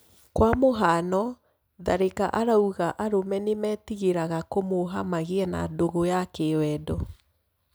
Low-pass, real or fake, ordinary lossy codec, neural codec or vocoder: none; real; none; none